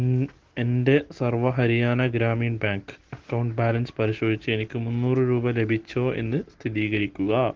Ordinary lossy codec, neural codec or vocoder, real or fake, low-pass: Opus, 16 kbps; none; real; 7.2 kHz